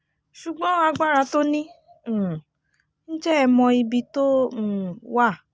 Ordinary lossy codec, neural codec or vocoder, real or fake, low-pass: none; none; real; none